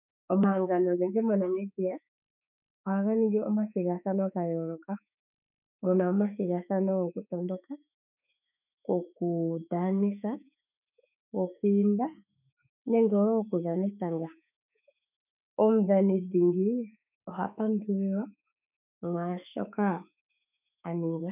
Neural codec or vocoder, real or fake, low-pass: autoencoder, 48 kHz, 32 numbers a frame, DAC-VAE, trained on Japanese speech; fake; 3.6 kHz